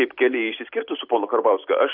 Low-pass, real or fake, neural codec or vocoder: 5.4 kHz; real; none